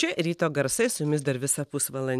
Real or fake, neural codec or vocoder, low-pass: real; none; 14.4 kHz